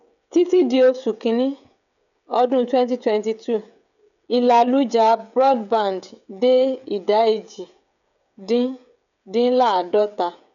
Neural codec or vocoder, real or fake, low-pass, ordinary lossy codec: codec, 16 kHz, 16 kbps, FreqCodec, smaller model; fake; 7.2 kHz; MP3, 96 kbps